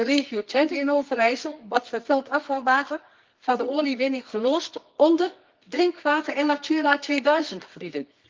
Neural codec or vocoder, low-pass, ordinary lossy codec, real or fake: codec, 24 kHz, 0.9 kbps, WavTokenizer, medium music audio release; 7.2 kHz; Opus, 32 kbps; fake